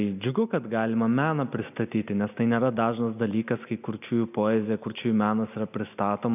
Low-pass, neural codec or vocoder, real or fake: 3.6 kHz; none; real